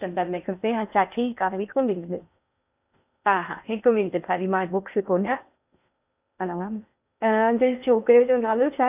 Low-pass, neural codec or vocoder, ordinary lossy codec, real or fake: 3.6 kHz; codec, 16 kHz in and 24 kHz out, 0.6 kbps, FocalCodec, streaming, 2048 codes; none; fake